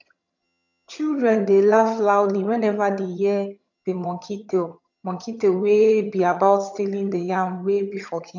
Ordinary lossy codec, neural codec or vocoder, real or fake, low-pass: none; vocoder, 22.05 kHz, 80 mel bands, HiFi-GAN; fake; 7.2 kHz